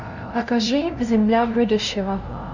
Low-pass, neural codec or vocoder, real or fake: 7.2 kHz; codec, 16 kHz, 0.5 kbps, FunCodec, trained on LibriTTS, 25 frames a second; fake